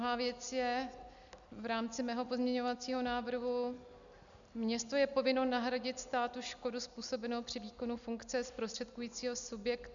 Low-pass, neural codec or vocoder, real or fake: 7.2 kHz; none; real